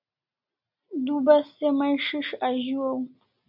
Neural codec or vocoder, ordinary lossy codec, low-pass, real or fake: none; AAC, 48 kbps; 5.4 kHz; real